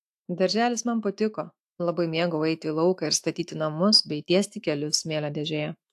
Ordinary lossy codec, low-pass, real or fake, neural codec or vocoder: AAC, 64 kbps; 14.4 kHz; fake; autoencoder, 48 kHz, 128 numbers a frame, DAC-VAE, trained on Japanese speech